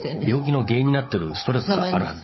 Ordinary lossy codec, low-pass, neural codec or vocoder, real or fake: MP3, 24 kbps; 7.2 kHz; codec, 16 kHz, 16 kbps, FunCodec, trained on Chinese and English, 50 frames a second; fake